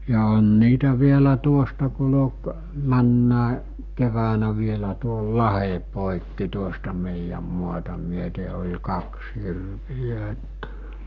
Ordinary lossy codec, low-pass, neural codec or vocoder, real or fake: none; 7.2 kHz; codec, 44.1 kHz, 7.8 kbps, Pupu-Codec; fake